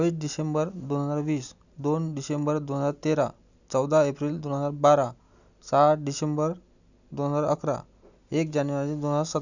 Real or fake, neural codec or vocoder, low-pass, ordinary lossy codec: real; none; 7.2 kHz; none